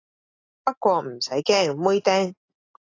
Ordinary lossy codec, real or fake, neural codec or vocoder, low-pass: AAC, 32 kbps; real; none; 7.2 kHz